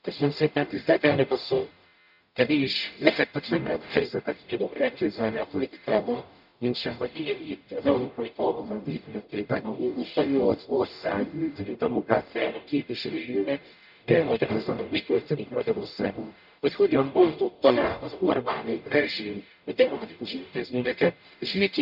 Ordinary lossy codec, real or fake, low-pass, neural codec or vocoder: none; fake; 5.4 kHz; codec, 44.1 kHz, 0.9 kbps, DAC